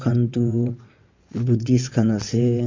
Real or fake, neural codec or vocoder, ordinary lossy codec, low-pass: fake; vocoder, 22.05 kHz, 80 mel bands, WaveNeXt; MP3, 48 kbps; 7.2 kHz